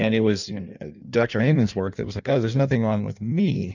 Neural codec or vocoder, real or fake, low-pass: codec, 16 kHz in and 24 kHz out, 1.1 kbps, FireRedTTS-2 codec; fake; 7.2 kHz